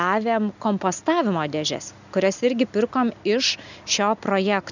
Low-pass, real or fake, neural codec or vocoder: 7.2 kHz; real; none